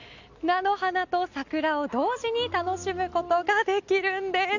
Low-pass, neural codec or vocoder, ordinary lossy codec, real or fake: 7.2 kHz; none; none; real